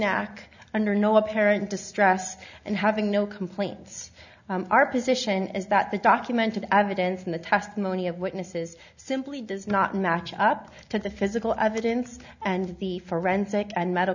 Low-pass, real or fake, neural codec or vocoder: 7.2 kHz; real; none